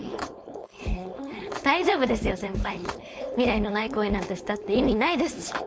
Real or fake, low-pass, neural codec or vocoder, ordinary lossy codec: fake; none; codec, 16 kHz, 4.8 kbps, FACodec; none